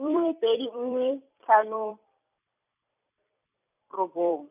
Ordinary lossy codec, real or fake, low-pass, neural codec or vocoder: none; fake; 3.6 kHz; vocoder, 44.1 kHz, 128 mel bands every 256 samples, BigVGAN v2